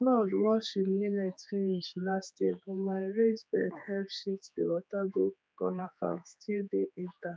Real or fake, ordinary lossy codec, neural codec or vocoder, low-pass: fake; none; codec, 16 kHz, 4 kbps, X-Codec, HuBERT features, trained on general audio; none